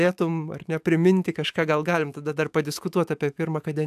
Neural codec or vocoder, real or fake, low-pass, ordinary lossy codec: autoencoder, 48 kHz, 128 numbers a frame, DAC-VAE, trained on Japanese speech; fake; 14.4 kHz; AAC, 96 kbps